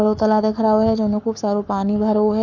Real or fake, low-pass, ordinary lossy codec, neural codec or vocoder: fake; 7.2 kHz; none; codec, 44.1 kHz, 7.8 kbps, Pupu-Codec